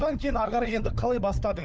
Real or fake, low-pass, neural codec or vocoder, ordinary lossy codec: fake; none; codec, 16 kHz, 4 kbps, FunCodec, trained on LibriTTS, 50 frames a second; none